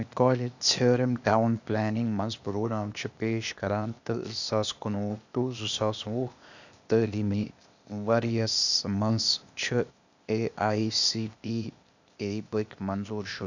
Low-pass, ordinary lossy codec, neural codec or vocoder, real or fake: 7.2 kHz; none; codec, 16 kHz, 0.8 kbps, ZipCodec; fake